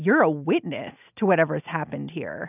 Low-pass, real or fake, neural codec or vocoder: 3.6 kHz; real; none